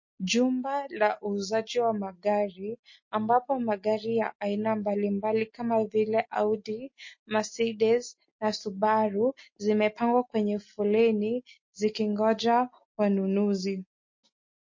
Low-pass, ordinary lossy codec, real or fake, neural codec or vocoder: 7.2 kHz; MP3, 32 kbps; real; none